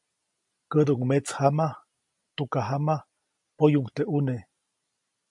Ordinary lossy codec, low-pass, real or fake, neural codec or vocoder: MP3, 64 kbps; 10.8 kHz; real; none